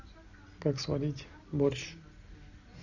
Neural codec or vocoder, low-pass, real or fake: none; 7.2 kHz; real